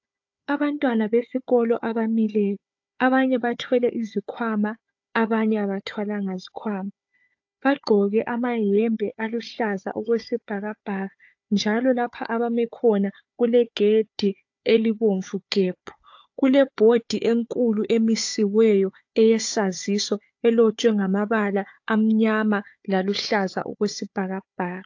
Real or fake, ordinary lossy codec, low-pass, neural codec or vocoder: fake; AAC, 48 kbps; 7.2 kHz; codec, 16 kHz, 4 kbps, FunCodec, trained on Chinese and English, 50 frames a second